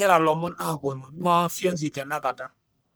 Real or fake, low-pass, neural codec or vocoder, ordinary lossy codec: fake; none; codec, 44.1 kHz, 1.7 kbps, Pupu-Codec; none